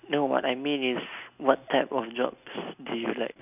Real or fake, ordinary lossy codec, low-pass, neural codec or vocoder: real; none; 3.6 kHz; none